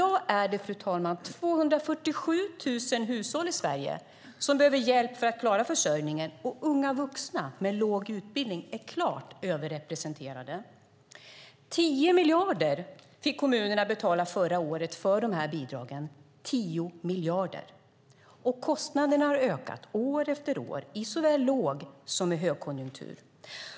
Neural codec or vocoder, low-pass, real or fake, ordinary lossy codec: none; none; real; none